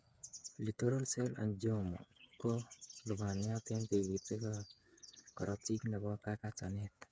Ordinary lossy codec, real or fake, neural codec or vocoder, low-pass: none; fake; codec, 16 kHz, 8 kbps, FreqCodec, smaller model; none